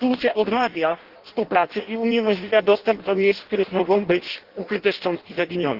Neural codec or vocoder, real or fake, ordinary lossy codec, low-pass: codec, 16 kHz in and 24 kHz out, 0.6 kbps, FireRedTTS-2 codec; fake; Opus, 16 kbps; 5.4 kHz